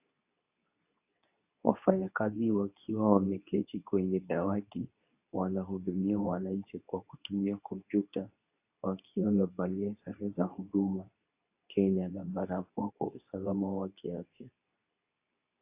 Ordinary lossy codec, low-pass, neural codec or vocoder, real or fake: AAC, 32 kbps; 3.6 kHz; codec, 24 kHz, 0.9 kbps, WavTokenizer, medium speech release version 2; fake